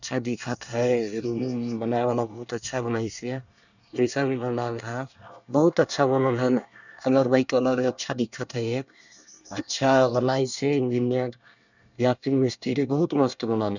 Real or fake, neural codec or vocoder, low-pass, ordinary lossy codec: fake; codec, 24 kHz, 1 kbps, SNAC; 7.2 kHz; none